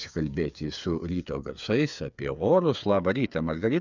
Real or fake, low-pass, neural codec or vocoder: fake; 7.2 kHz; codec, 16 kHz, 8 kbps, FreqCodec, smaller model